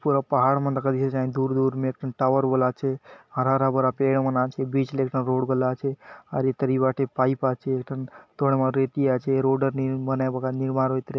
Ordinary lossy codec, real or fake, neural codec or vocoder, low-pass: none; real; none; none